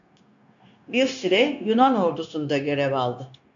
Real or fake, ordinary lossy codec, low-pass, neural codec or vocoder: fake; AAC, 64 kbps; 7.2 kHz; codec, 16 kHz, 0.9 kbps, LongCat-Audio-Codec